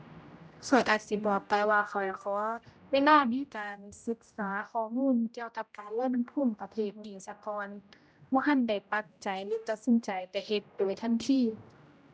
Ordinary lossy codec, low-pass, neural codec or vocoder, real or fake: none; none; codec, 16 kHz, 0.5 kbps, X-Codec, HuBERT features, trained on general audio; fake